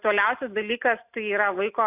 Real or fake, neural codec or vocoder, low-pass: real; none; 3.6 kHz